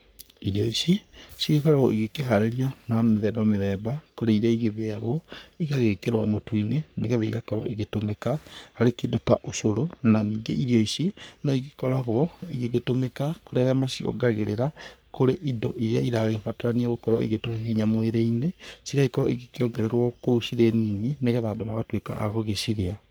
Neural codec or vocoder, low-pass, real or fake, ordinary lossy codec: codec, 44.1 kHz, 3.4 kbps, Pupu-Codec; none; fake; none